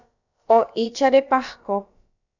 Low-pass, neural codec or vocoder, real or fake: 7.2 kHz; codec, 16 kHz, about 1 kbps, DyCAST, with the encoder's durations; fake